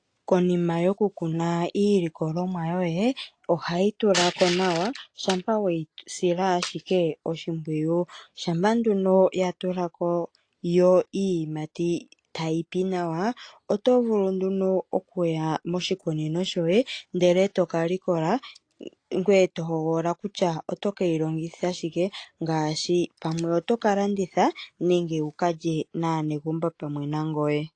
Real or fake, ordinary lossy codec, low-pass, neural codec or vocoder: real; AAC, 48 kbps; 9.9 kHz; none